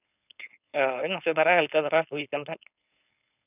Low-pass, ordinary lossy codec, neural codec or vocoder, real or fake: 3.6 kHz; none; codec, 16 kHz, 4.8 kbps, FACodec; fake